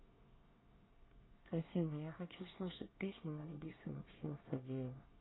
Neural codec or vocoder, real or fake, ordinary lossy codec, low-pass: codec, 24 kHz, 1 kbps, SNAC; fake; AAC, 16 kbps; 7.2 kHz